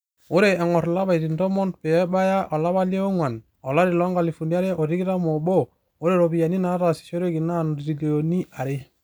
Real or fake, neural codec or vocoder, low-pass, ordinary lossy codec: real; none; none; none